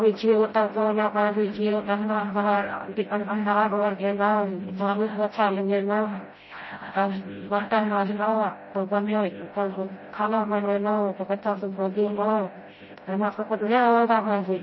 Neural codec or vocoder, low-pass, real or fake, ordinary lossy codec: codec, 16 kHz, 0.5 kbps, FreqCodec, smaller model; 7.2 kHz; fake; MP3, 24 kbps